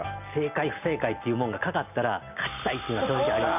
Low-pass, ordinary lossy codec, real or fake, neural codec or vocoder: 3.6 kHz; none; real; none